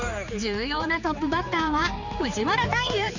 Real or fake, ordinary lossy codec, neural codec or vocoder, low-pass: fake; none; codec, 16 kHz, 4 kbps, X-Codec, HuBERT features, trained on general audio; 7.2 kHz